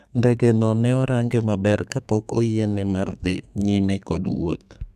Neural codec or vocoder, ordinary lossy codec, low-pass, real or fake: codec, 32 kHz, 1.9 kbps, SNAC; none; 14.4 kHz; fake